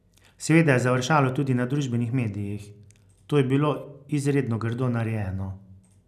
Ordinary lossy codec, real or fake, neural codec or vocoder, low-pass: none; real; none; 14.4 kHz